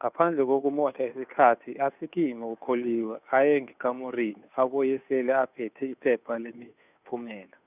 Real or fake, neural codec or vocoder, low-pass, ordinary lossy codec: fake; codec, 16 kHz, 2 kbps, FunCodec, trained on Chinese and English, 25 frames a second; 3.6 kHz; none